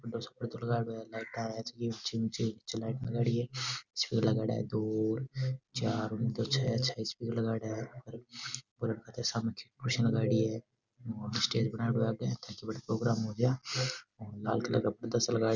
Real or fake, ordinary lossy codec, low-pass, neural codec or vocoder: real; none; 7.2 kHz; none